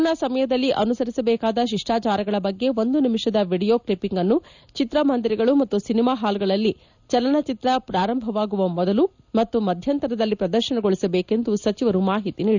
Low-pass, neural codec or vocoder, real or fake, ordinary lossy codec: 7.2 kHz; none; real; none